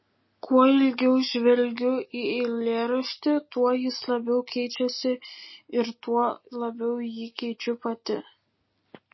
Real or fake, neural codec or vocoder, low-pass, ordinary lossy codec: real; none; 7.2 kHz; MP3, 24 kbps